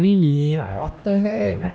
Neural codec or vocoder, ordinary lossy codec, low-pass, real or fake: codec, 16 kHz, 1 kbps, X-Codec, HuBERT features, trained on LibriSpeech; none; none; fake